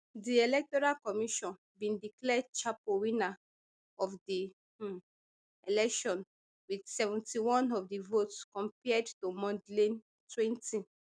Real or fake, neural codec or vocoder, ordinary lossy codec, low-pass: real; none; none; 9.9 kHz